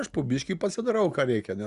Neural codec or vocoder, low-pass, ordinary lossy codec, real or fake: none; 10.8 kHz; Opus, 64 kbps; real